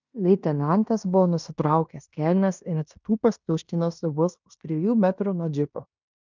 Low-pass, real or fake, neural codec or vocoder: 7.2 kHz; fake; codec, 16 kHz in and 24 kHz out, 0.9 kbps, LongCat-Audio-Codec, fine tuned four codebook decoder